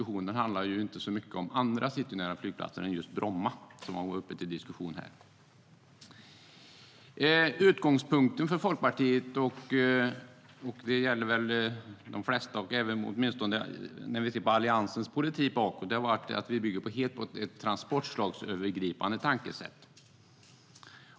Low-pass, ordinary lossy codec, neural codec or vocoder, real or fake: none; none; none; real